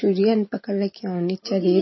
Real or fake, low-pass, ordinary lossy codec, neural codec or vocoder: real; 7.2 kHz; MP3, 24 kbps; none